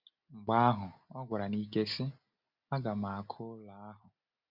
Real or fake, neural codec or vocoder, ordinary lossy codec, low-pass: real; none; Opus, 64 kbps; 5.4 kHz